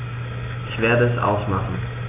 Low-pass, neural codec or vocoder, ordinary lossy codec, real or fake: 3.6 kHz; none; AAC, 24 kbps; real